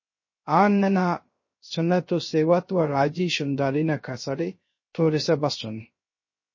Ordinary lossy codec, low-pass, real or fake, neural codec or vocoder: MP3, 32 kbps; 7.2 kHz; fake; codec, 16 kHz, 0.3 kbps, FocalCodec